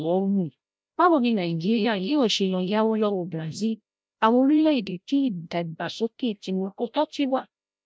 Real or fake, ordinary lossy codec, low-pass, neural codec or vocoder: fake; none; none; codec, 16 kHz, 0.5 kbps, FreqCodec, larger model